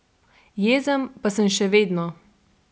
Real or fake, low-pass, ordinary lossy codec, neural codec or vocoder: real; none; none; none